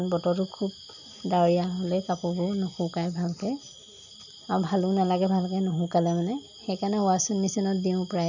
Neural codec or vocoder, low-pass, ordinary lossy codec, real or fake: none; 7.2 kHz; none; real